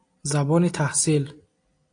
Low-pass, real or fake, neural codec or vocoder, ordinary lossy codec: 9.9 kHz; real; none; AAC, 48 kbps